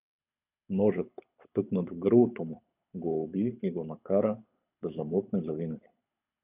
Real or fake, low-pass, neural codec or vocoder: fake; 3.6 kHz; codec, 24 kHz, 6 kbps, HILCodec